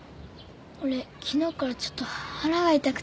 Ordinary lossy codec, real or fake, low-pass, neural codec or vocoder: none; real; none; none